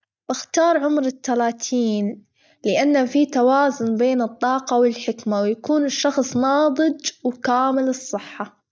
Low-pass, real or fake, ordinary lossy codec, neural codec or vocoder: none; real; none; none